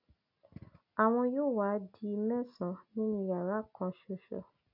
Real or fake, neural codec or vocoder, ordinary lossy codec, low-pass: real; none; none; 5.4 kHz